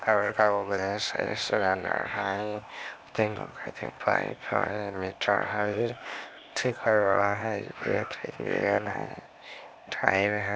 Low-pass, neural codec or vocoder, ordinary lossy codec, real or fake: none; codec, 16 kHz, 0.8 kbps, ZipCodec; none; fake